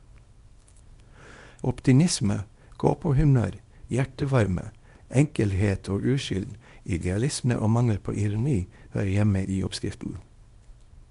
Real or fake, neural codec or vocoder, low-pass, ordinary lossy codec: fake; codec, 24 kHz, 0.9 kbps, WavTokenizer, small release; 10.8 kHz; MP3, 64 kbps